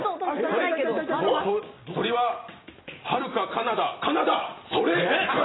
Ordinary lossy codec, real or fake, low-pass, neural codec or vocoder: AAC, 16 kbps; real; 7.2 kHz; none